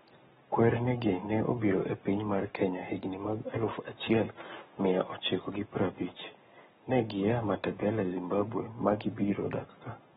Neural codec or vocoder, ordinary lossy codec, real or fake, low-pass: codec, 44.1 kHz, 7.8 kbps, Pupu-Codec; AAC, 16 kbps; fake; 19.8 kHz